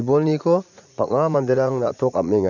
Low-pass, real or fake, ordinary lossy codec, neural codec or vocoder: 7.2 kHz; fake; none; vocoder, 44.1 kHz, 80 mel bands, Vocos